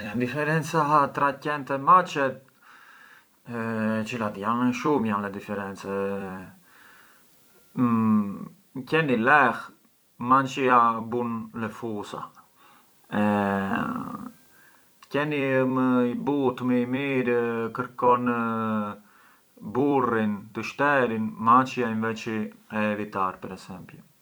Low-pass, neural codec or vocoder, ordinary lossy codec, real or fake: none; vocoder, 44.1 kHz, 128 mel bands every 256 samples, BigVGAN v2; none; fake